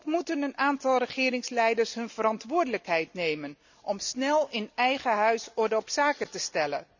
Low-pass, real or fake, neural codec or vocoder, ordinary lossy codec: 7.2 kHz; real; none; none